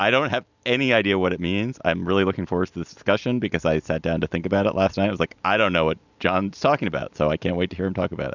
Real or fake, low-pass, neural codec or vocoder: real; 7.2 kHz; none